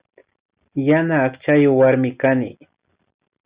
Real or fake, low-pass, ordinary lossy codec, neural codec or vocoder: real; 3.6 kHz; Opus, 64 kbps; none